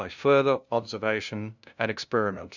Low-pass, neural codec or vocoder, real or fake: 7.2 kHz; codec, 16 kHz, 0.5 kbps, FunCodec, trained on LibriTTS, 25 frames a second; fake